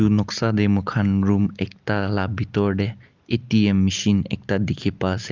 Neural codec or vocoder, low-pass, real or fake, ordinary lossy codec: none; 7.2 kHz; real; Opus, 32 kbps